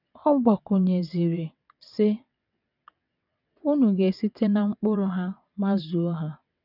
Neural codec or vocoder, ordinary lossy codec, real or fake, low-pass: vocoder, 22.05 kHz, 80 mel bands, WaveNeXt; none; fake; 5.4 kHz